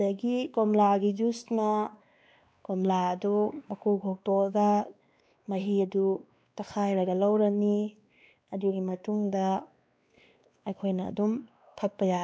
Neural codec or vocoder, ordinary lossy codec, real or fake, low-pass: codec, 16 kHz, 2 kbps, X-Codec, WavLM features, trained on Multilingual LibriSpeech; none; fake; none